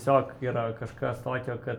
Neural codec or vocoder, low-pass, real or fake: none; 19.8 kHz; real